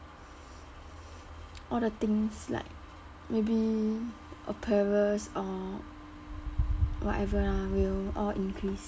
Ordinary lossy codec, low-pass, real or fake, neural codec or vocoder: none; none; real; none